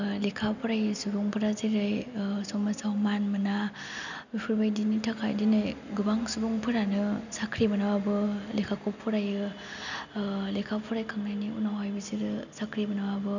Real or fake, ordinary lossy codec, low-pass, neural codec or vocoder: real; none; 7.2 kHz; none